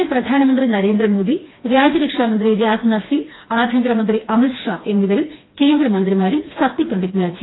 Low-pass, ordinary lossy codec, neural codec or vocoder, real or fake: 7.2 kHz; AAC, 16 kbps; codec, 16 kHz, 2 kbps, FreqCodec, smaller model; fake